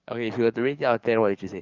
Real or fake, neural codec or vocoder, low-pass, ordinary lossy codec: fake; codec, 44.1 kHz, 7.8 kbps, DAC; 7.2 kHz; Opus, 32 kbps